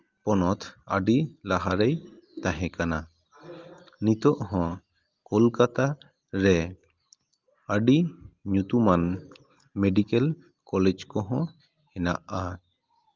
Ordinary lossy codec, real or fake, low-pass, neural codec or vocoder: Opus, 32 kbps; real; 7.2 kHz; none